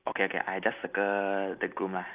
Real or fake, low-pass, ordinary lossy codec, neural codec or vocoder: real; 3.6 kHz; Opus, 24 kbps; none